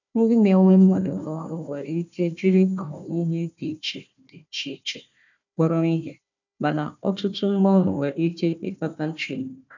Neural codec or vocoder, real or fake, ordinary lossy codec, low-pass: codec, 16 kHz, 1 kbps, FunCodec, trained on Chinese and English, 50 frames a second; fake; none; 7.2 kHz